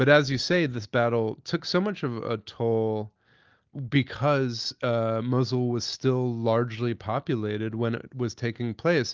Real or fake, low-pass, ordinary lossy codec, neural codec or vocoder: real; 7.2 kHz; Opus, 24 kbps; none